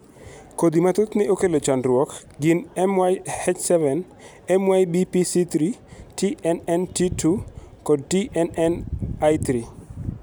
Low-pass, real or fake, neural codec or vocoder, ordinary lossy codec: none; real; none; none